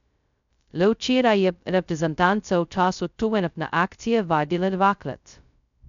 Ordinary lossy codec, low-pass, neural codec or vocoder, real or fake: none; 7.2 kHz; codec, 16 kHz, 0.2 kbps, FocalCodec; fake